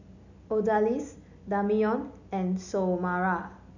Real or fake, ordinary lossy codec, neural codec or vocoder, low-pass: real; none; none; 7.2 kHz